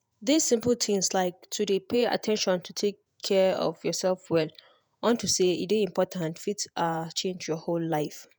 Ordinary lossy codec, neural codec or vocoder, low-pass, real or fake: none; vocoder, 48 kHz, 128 mel bands, Vocos; none; fake